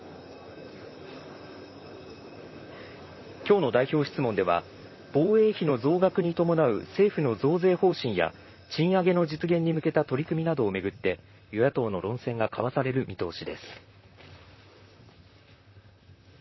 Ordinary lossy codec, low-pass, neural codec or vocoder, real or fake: MP3, 24 kbps; 7.2 kHz; vocoder, 44.1 kHz, 128 mel bands, Pupu-Vocoder; fake